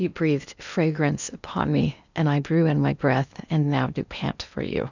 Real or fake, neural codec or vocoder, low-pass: fake; codec, 16 kHz in and 24 kHz out, 0.8 kbps, FocalCodec, streaming, 65536 codes; 7.2 kHz